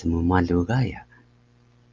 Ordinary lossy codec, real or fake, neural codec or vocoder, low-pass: Opus, 24 kbps; real; none; 7.2 kHz